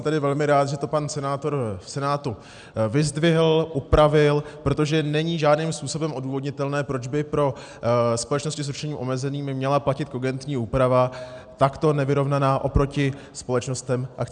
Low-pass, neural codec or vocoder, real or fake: 9.9 kHz; none; real